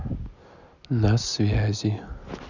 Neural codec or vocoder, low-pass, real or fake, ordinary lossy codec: none; 7.2 kHz; real; none